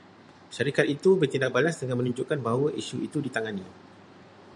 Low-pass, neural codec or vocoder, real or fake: 10.8 kHz; vocoder, 44.1 kHz, 128 mel bands every 512 samples, BigVGAN v2; fake